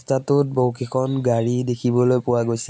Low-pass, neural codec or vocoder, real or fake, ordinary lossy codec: none; none; real; none